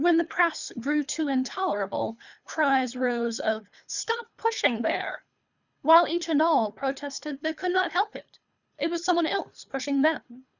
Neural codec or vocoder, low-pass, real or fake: codec, 24 kHz, 3 kbps, HILCodec; 7.2 kHz; fake